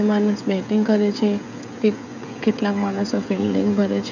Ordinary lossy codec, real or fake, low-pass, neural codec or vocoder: none; fake; 7.2 kHz; codec, 16 kHz, 16 kbps, FreqCodec, smaller model